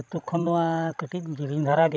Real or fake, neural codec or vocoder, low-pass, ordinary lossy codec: fake; codec, 16 kHz, 16 kbps, FreqCodec, larger model; none; none